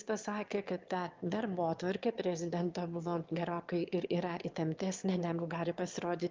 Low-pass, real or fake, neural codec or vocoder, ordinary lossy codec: 7.2 kHz; fake; autoencoder, 22.05 kHz, a latent of 192 numbers a frame, VITS, trained on one speaker; Opus, 32 kbps